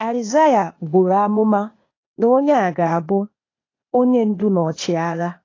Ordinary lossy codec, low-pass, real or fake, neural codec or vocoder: AAC, 32 kbps; 7.2 kHz; fake; codec, 16 kHz, 0.8 kbps, ZipCodec